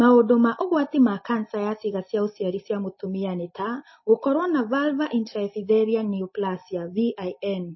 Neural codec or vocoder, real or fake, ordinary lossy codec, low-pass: none; real; MP3, 24 kbps; 7.2 kHz